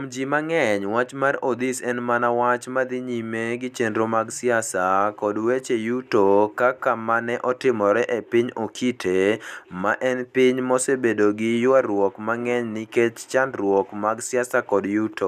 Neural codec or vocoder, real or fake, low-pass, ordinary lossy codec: none; real; 14.4 kHz; none